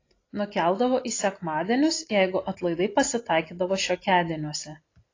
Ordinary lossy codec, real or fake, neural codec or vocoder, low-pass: AAC, 32 kbps; real; none; 7.2 kHz